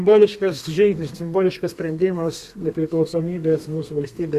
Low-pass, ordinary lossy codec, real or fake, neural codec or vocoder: 14.4 kHz; Opus, 64 kbps; fake; codec, 32 kHz, 1.9 kbps, SNAC